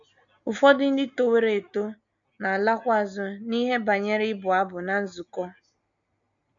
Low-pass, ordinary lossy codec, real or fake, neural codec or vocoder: 7.2 kHz; none; real; none